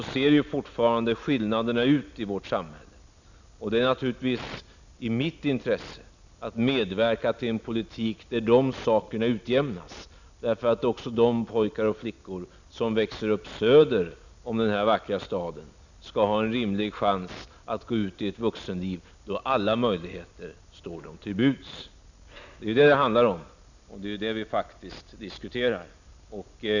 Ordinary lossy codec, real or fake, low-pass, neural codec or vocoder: none; real; 7.2 kHz; none